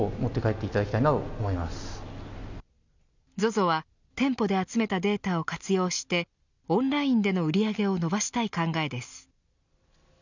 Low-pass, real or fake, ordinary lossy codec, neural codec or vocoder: 7.2 kHz; real; none; none